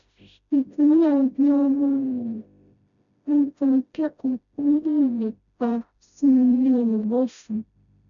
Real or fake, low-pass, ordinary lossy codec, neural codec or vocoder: fake; 7.2 kHz; none; codec, 16 kHz, 0.5 kbps, FreqCodec, smaller model